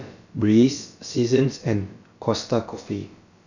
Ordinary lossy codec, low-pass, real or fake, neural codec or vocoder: none; 7.2 kHz; fake; codec, 16 kHz, about 1 kbps, DyCAST, with the encoder's durations